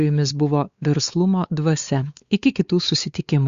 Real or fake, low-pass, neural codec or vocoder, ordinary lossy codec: fake; 7.2 kHz; codec, 16 kHz, 4 kbps, X-Codec, WavLM features, trained on Multilingual LibriSpeech; Opus, 64 kbps